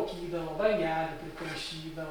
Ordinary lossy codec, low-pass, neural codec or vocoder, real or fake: Opus, 64 kbps; 19.8 kHz; none; real